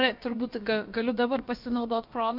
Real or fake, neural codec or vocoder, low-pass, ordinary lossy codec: fake; codec, 16 kHz, about 1 kbps, DyCAST, with the encoder's durations; 5.4 kHz; MP3, 48 kbps